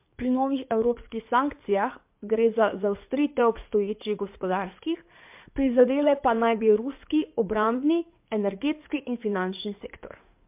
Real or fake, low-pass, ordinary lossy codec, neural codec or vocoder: fake; 3.6 kHz; MP3, 32 kbps; codec, 16 kHz in and 24 kHz out, 2.2 kbps, FireRedTTS-2 codec